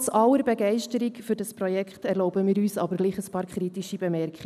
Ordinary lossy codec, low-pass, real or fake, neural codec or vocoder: none; 14.4 kHz; real; none